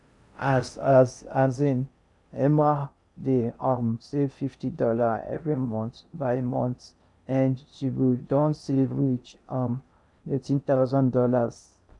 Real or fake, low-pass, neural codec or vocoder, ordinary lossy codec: fake; 10.8 kHz; codec, 16 kHz in and 24 kHz out, 0.6 kbps, FocalCodec, streaming, 4096 codes; none